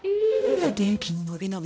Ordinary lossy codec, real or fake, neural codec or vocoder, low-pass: none; fake; codec, 16 kHz, 0.5 kbps, X-Codec, HuBERT features, trained on balanced general audio; none